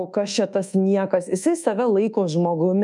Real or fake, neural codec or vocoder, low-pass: fake; codec, 24 kHz, 1.2 kbps, DualCodec; 10.8 kHz